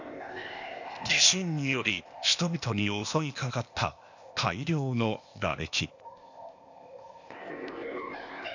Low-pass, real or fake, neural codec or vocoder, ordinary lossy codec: 7.2 kHz; fake; codec, 16 kHz, 0.8 kbps, ZipCodec; none